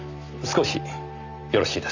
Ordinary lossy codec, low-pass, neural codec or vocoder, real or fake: Opus, 64 kbps; 7.2 kHz; none; real